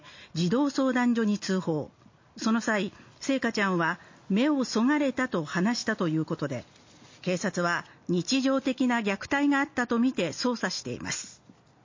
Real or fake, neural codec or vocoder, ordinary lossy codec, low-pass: real; none; MP3, 32 kbps; 7.2 kHz